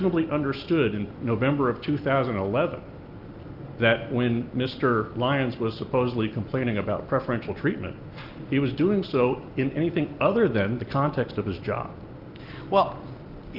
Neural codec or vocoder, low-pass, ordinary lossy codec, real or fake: none; 5.4 kHz; Opus, 24 kbps; real